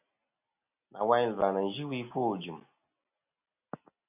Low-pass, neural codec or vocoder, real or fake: 3.6 kHz; none; real